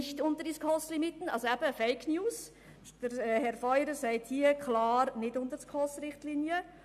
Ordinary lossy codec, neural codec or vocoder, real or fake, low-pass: AAC, 96 kbps; none; real; 14.4 kHz